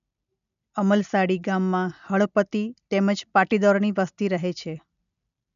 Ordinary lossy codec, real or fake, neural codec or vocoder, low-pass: none; real; none; 7.2 kHz